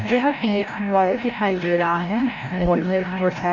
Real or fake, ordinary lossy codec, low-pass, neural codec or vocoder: fake; none; 7.2 kHz; codec, 16 kHz, 0.5 kbps, FreqCodec, larger model